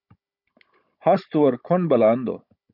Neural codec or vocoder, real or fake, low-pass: codec, 16 kHz, 16 kbps, FunCodec, trained on Chinese and English, 50 frames a second; fake; 5.4 kHz